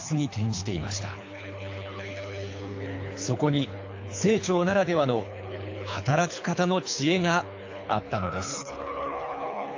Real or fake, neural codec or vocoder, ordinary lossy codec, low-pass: fake; codec, 24 kHz, 3 kbps, HILCodec; AAC, 48 kbps; 7.2 kHz